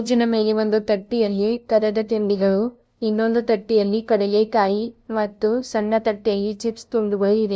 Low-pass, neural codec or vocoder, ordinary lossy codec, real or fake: none; codec, 16 kHz, 0.5 kbps, FunCodec, trained on LibriTTS, 25 frames a second; none; fake